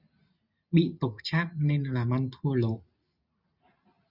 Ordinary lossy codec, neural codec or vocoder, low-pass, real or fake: Opus, 64 kbps; none; 5.4 kHz; real